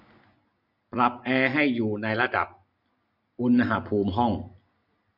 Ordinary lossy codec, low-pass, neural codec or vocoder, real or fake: none; 5.4 kHz; none; real